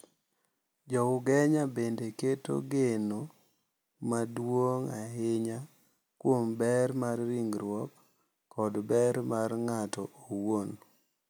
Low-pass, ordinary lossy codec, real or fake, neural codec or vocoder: none; none; real; none